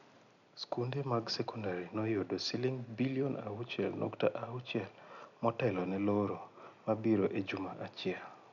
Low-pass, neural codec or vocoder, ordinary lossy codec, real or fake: 7.2 kHz; none; none; real